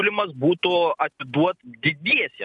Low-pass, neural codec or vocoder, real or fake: 10.8 kHz; none; real